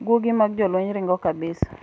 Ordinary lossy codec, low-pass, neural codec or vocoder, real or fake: none; none; none; real